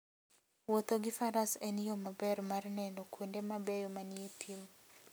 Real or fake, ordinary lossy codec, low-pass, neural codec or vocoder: real; none; none; none